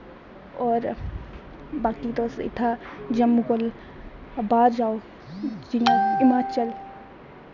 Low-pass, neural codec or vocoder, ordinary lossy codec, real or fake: 7.2 kHz; none; none; real